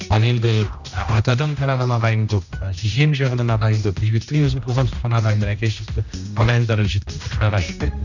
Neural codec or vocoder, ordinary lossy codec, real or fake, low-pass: codec, 16 kHz, 1 kbps, X-Codec, HuBERT features, trained on general audio; none; fake; 7.2 kHz